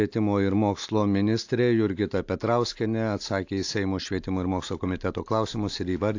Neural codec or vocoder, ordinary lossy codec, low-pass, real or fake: none; AAC, 48 kbps; 7.2 kHz; real